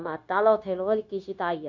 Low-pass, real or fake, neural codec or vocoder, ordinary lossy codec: 7.2 kHz; fake; codec, 16 kHz, 0.9 kbps, LongCat-Audio-Codec; none